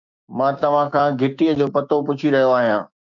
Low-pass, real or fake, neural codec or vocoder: 7.2 kHz; fake; codec, 16 kHz, 6 kbps, DAC